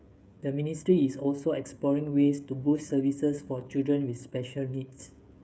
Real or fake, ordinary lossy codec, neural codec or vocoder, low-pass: fake; none; codec, 16 kHz, 16 kbps, FreqCodec, smaller model; none